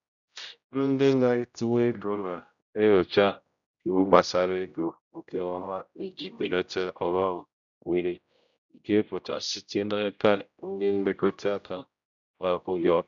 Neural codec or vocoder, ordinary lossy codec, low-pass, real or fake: codec, 16 kHz, 0.5 kbps, X-Codec, HuBERT features, trained on general audio; none; 7.2 kHz; fake